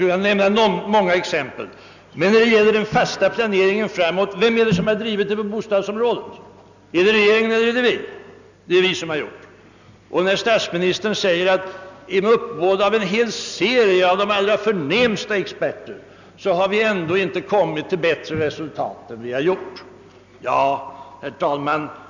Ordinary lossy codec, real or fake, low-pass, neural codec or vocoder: none; real; 7.2 kHz; none